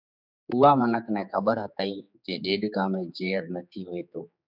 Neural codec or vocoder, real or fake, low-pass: codec, 16 kHz, 4 kbps, X-Codec, HuBERT features, trained on general audio; fake; 5.4 kHz